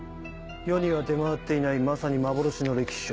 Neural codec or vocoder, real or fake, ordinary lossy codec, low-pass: none; real; none; none